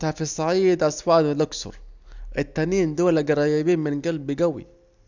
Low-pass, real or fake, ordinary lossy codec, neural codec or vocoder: 7.2 kHz; real; none; none